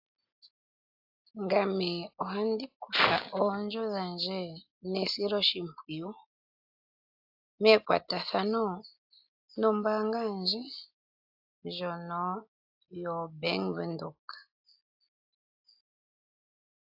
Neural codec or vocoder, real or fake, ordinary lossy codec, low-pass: none; real; AAC, 48 kbps; 5.4 kHz